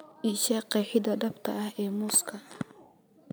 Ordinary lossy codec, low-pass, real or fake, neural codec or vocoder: none; none; real; none